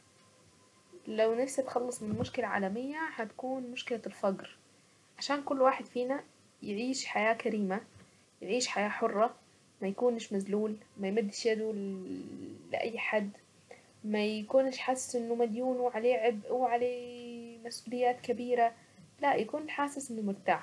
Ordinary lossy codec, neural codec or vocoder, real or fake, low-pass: none; none; real; none